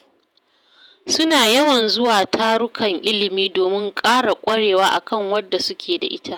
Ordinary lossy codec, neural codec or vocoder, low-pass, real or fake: none; vocoder, 48 kHz, 128 mel bands, Vocos; none; fake